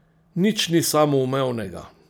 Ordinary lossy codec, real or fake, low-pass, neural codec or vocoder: none; fake; none; vocoder, 44.1 kHz, 128 mel bands every 256 samples, BigVGAN v2